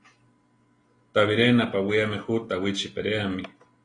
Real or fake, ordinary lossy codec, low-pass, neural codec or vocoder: real; AAC, 32 kbps; 9.9 kHz; none